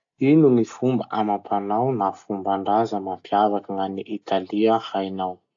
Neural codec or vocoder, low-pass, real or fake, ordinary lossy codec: none; 7.2 kHz; real; AAC, 64 kbps